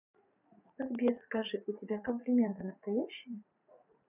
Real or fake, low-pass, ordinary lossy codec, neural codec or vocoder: fake; 3.6 kHz; none; vocoder, 44.1 kHz, 80 mel bands, Vocos